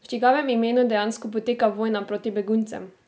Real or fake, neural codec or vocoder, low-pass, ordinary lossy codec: real; none; none; none